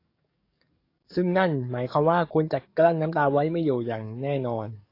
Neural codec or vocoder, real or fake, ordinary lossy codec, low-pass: codec, 44.1 kHz, 7.8 kbps, DAC; fake; AAC, 32 kbps; 5.4 kHz